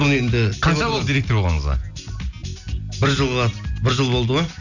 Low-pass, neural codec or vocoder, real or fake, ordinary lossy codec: 7.2 kHz; none; real; none